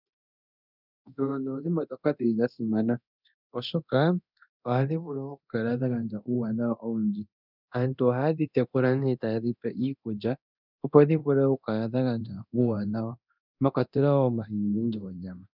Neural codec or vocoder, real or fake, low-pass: codec, 24 kHz, 0.9 kbps, DualCodec; fake; 5.4 kHz